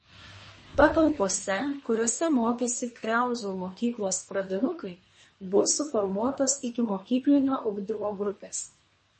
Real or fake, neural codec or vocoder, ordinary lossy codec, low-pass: fake; codec, 24 kHz, 1 kbps, SNAC; MP3, 32 kbps; 10.8 kHz